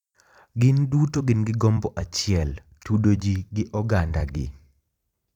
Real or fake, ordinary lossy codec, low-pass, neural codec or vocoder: fake; none; 19.8 kHz; vocoder, 44.1 kHz, 128 mel bands every 256 samples, BigVGAN v2